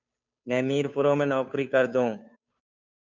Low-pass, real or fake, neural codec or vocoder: 7.2 kHz; fake; codec, 16 kHz, 2 kbps, FunCodec, trained on Chinese and English, 25 frames a second